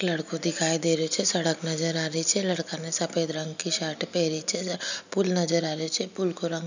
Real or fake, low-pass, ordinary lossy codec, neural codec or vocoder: real; 7.2 kHz; none; none